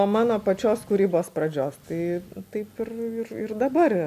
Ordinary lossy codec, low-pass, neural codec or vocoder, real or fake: MP3, 96 kbps; 14.4 kHz; none; real